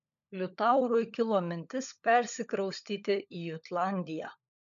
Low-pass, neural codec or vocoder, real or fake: 7.2 kHz; codec, 16 kHz, 16 kbps, FunCodec, trained on LibriTTS, 50 frames a second; fake